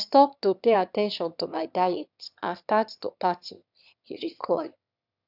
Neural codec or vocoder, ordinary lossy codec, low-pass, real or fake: autoencoder, 22.05 kHz, a latent of 192 numbers a frame, VITS, trained on one speaker; none; 5.4 kHz; fake